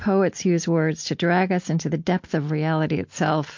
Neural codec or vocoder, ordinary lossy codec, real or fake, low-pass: none; MP3, 48 kbps; real; 7.2 kHz